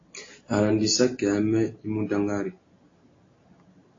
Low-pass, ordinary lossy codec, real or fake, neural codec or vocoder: 7.2 kHz; AAC, 32 kbps; real; none